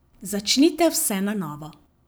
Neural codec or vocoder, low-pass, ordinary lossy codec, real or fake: vocoder, 44.1 kHz, 128 mel bands every 512 samples, BigVGAN v2; none; none; fake